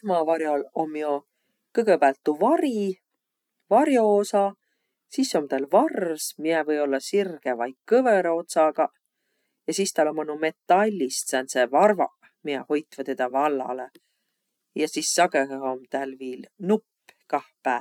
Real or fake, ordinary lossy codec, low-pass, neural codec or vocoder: real; none; 19.8 kHz; none